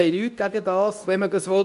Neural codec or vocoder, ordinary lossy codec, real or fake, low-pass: codec, 24 kHz, 0.9 kbps, WavTokenizer, medium speech release version 2; none; fake; 10.8 kHz